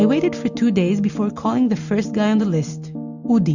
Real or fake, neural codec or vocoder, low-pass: real; none; 7.2 kHz